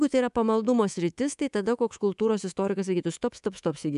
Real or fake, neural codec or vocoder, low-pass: fake; codec, 24 kHz, 3.1 kbps, DualCodec; 10.8 kHz